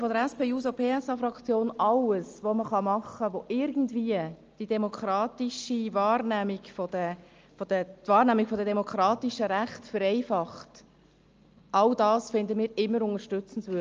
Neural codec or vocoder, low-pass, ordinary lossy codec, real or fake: none; 7.2 kHz; Opus, 24 kbps; real